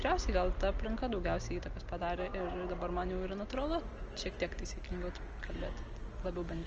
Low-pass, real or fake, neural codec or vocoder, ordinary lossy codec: 7.2 kHz; real; none; Opus, 24 kbps